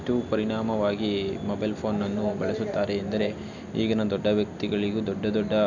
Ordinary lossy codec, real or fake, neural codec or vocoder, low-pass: none; real; none; 7.2 kHz